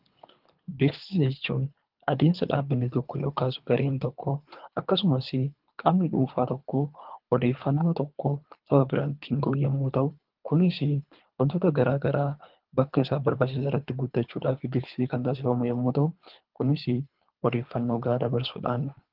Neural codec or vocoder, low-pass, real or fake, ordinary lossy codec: codec, 24 kHz, 3 kbps, HILCodec; 5.4 kHz; fake; Opus, 32 kbps